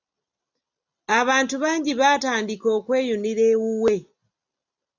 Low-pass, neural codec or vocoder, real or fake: 7.2 kHz; none; real